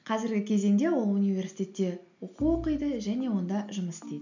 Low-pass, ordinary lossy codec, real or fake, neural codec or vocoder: 7.2 kHz; none; real; none